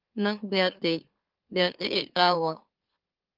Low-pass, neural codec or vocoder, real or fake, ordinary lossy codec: 5.4 kHz; autoencoder, 44.1 kHz, a latent of 192 numbers a frame, MeloTTS; fake; Opus, 32 kbps